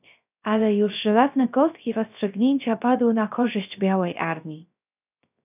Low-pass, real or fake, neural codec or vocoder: 3.6 kHz; fake; codec, 16 kHz, 0.3 kbps, FocalCodec